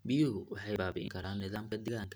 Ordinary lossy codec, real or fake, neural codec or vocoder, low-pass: none; real; none; none